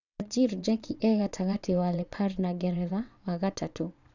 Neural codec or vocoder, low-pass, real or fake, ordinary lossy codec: vocoder, 22.05 kHz, 80 mel bands, WaveNeXt; 7.2 kHz; fake; none